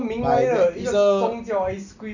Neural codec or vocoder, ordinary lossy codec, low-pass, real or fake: none; none; 7.2 kHz; real